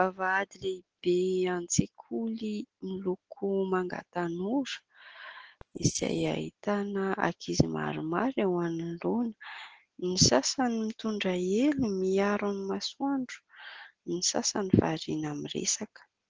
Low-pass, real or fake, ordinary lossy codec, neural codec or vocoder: 7.2 kHz; real; Opus, 16 kbps; none